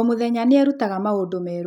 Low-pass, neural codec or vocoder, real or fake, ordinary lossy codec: 19.8 kHz; none; real; none